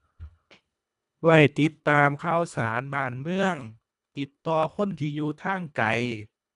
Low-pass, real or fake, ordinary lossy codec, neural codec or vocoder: 10.8 kHz; fake; none; codec, 24 kHz, 1.5 kbps, HILCodec